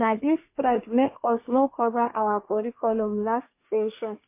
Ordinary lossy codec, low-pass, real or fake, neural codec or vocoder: MP3, 24 kbps; 3.6 kHz; fake; codec, 24 kHz, 1 kbps, SNAC